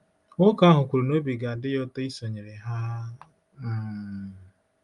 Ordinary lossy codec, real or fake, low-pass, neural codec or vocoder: Opus, 32 kbps; real; 10.8 kHz; none